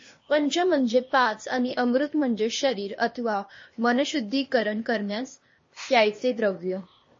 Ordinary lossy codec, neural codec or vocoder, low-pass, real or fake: MP3, 32 kbps; codec, 16 kHz, 0.8 kbps, ZipCodec; 7.2 kHz; fake